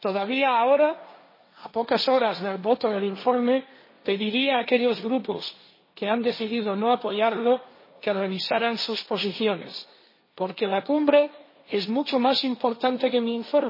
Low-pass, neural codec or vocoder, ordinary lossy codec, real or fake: 5.4 kHz; codec, 16 kHz, 1.1 kbps, Voila-Tokenizer; MP3, 24 kbps; fake